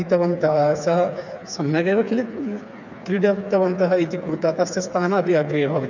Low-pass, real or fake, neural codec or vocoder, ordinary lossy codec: 7.2 kHz; fake; codec, 16 kHz, 4 kbps, FreqCodec, smaller model; none